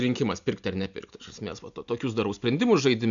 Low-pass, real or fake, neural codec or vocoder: 7.2 kHz; real; none